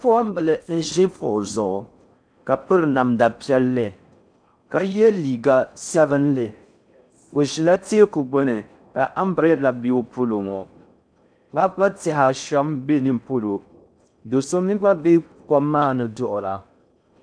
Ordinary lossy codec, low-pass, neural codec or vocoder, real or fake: MP3, 96 kbps; 9.9 kHz; codec, 16 kHz in and 24 kHz out, 0.6 kbps, FocalCodec, streaming, 4096 codes; fake